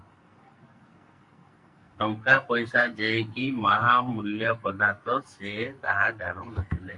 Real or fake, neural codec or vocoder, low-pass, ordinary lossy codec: fake; codec, 44.1 kHz, 2.6 kbps, SNAC; 10.8 kHz; Opus, 64 kbps